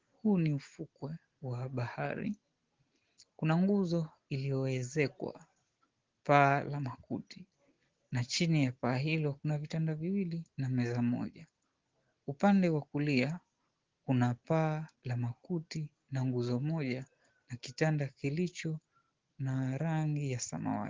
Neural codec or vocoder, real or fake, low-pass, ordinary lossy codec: none; real; 7.2 kHz; Opus, 16 kbps